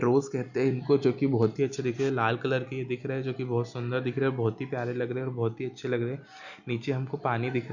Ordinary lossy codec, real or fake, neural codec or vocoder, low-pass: none; real; none; 7.2 kHz